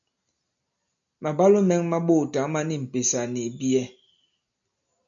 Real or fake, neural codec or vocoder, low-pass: real; none; 7.2 kHz